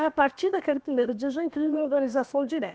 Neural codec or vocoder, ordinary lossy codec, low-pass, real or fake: codec, 16 kHz, about 1 kbps, DyCAST, with the encoder's durations; none; none; fake